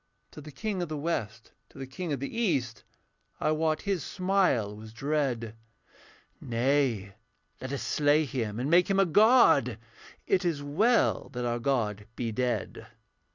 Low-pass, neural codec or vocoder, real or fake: 7.2 kHz; none; real